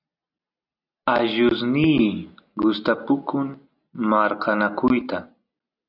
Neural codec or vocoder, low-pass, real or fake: none; 5.4 kHz; real